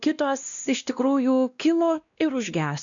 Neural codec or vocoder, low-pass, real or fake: codec, 16 kHz, 1 kbps, X-Codec, WavLM features, trained on Multilingual LibriSpeech; 7.2 kHz; fake